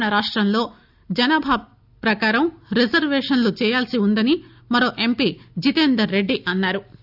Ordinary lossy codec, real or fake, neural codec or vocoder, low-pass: AAC, 48 kbps; real; none; 5.4 kHz